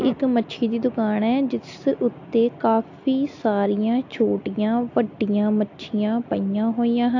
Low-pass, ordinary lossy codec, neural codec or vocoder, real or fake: 7.2 kHz; AAC, 48 kbps; none; real